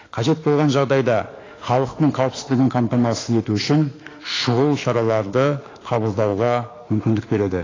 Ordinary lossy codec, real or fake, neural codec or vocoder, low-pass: AAC, 32 kbps; fake; autoencoder, 48 kHz, 32 numbers a frame, DAC-VAE, trained on Japanese speech; 7.2 kHz